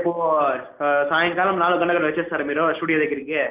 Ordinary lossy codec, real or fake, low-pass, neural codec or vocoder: Opus, 24 kbps; real; 3.6 kHz; none